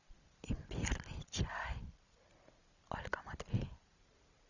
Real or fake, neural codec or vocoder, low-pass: real; none; 7.2 kHz